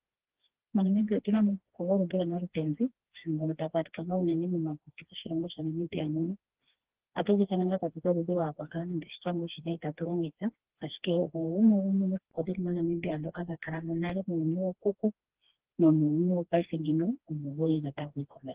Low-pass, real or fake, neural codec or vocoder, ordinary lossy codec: 3.6 kHz; fake; codec, 16 kHz, 2 kbps, FreqCodec, smaller model; Opus, 16 kbps